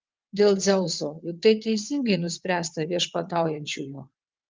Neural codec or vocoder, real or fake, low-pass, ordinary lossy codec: vocoder, 22.05 kHz, 80 mel bands, WaveNeXt; fake; 7.2 kHz; Opus, 32 kbps